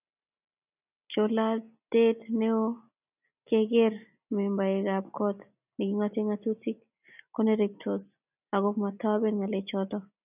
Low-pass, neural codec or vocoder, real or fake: 3.6 kHz; none; real